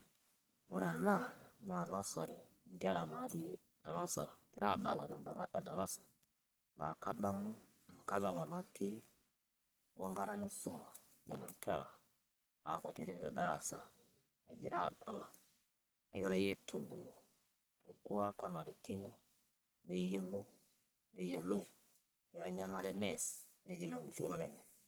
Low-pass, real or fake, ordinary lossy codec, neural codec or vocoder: none; fake; none; codec, 44.1 kHz, 1.7 kbps, Pupu-Codec